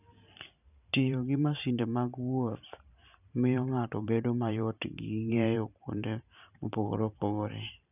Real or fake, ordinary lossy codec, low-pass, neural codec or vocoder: fake; none; 3.6 kHz; vocoder, 44.1 kHz, 128 mel bands every 512 samples, BigVGAN v2